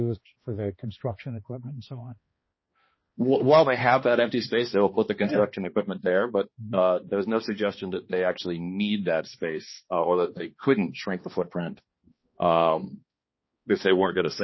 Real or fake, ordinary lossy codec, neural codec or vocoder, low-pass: fake; MP3, 24 kbps; codec, 16 kHz, 2 kbps, X-Codec, HuBERT features, trained on balanced general audio; 7.2 kHz